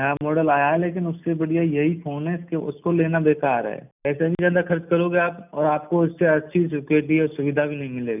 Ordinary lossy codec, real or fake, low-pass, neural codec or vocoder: none; real; 3.6 kHz; none